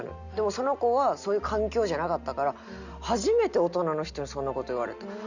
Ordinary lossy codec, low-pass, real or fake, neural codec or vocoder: none; 7.2 kHz; real; none